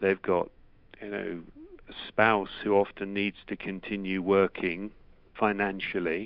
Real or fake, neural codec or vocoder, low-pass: fake; autoencoder, 48 kHz, 128 numbers a frame, DAC-VAE, trained on Japanese speech; 5.4 kHz